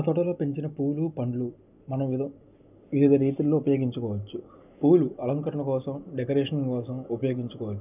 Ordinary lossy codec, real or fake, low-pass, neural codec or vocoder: none; real; 3.6 kHz; none